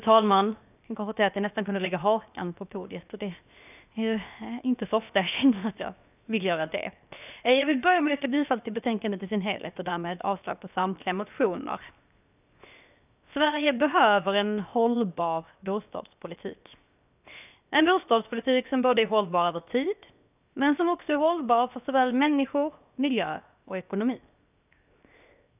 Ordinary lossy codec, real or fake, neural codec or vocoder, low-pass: none; fake; codec, 16 kHz, 0.7 kbps, FocalCodec; 3.6 kHz